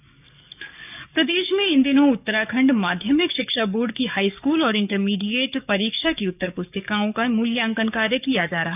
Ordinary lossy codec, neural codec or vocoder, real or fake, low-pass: none; codec, 44.1 kHz, 7.8 kbps, DAC; fake; 3.6 kHz